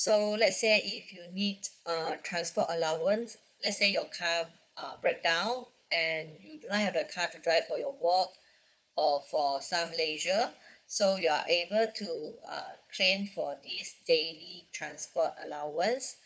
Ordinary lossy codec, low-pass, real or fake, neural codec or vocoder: none; none; fake; codec, 16 kHz, 4 kbps, FunCodec, trained on Chinese and English, 50 frames a second